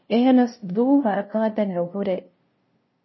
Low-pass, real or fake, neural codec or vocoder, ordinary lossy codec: 7.2 kHz; fake; codec, 16 kHz, 0.5 kbps, FunCodec, trained on LibriTTS, 25 frames a second; MP3, 24 kbps